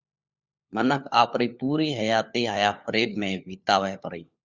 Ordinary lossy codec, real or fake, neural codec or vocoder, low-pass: Opus, 64 kbps; fake; codec, 16 kHz, 4 kbps, FunCodec, trained on LibriTTS, 50 frames a second; 7.2 kHz